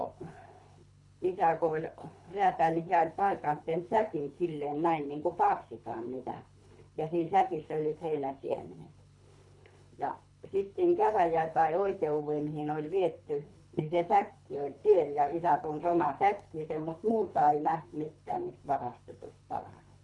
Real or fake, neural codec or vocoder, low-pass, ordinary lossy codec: fake; codec, 24 kHz, 3 kbps, HILCodec; 10.8 kHz; none